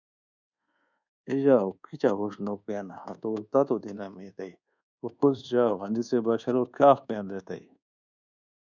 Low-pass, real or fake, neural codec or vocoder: 7.2 kHz; fake; codec, 24 kHz, 1.2 kbps, DualCodec